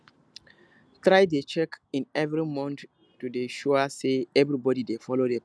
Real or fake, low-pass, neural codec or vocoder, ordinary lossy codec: real; none; none; none